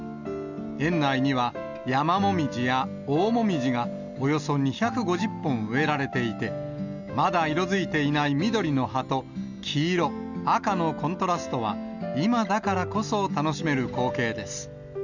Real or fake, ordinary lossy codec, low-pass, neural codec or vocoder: real; none; 7.2 kHz; none